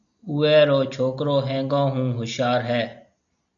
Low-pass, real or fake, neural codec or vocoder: 7.2 kHz; real; none